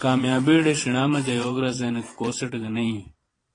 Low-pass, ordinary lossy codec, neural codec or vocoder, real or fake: 9.9 kHz; AAC, 32 kbps; vocoder, 22.05 kHz, 80 mel bands, Vocos; fake